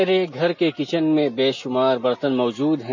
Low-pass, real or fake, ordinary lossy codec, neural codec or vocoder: 7.2 kHz; real; MP3, 32 kbps; none